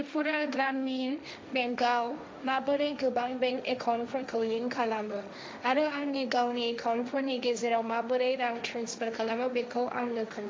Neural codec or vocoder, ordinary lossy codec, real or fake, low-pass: codec, 16 kHz, 1.1 kbps, Voila-Tokenizer; none; fake; none